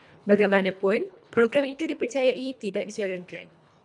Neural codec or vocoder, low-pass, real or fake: codec, 24 kHz, 1.5 kbps, HILCodec; 10.8 kHz; fake